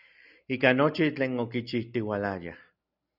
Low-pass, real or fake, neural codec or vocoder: 5.4 kHz; real; none